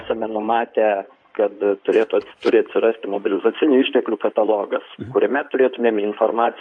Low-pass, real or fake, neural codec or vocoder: 9.9 kHz; fake; codec, 16 kHz in and 24 kHz out, 2.2 kbps, FireRedTTS-2 codec